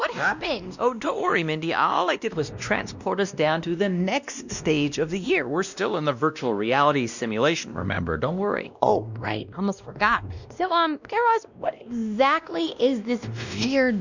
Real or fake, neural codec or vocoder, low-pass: fake; codec, 16 kHz, 1 kbps, X-Codec, WavLM features, trained on Multilingual LibriSpeech; 7.2 kHz